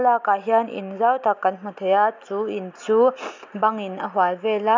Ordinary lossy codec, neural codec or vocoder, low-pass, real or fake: MP3, 64 kbps; none; 7.2 kHz; real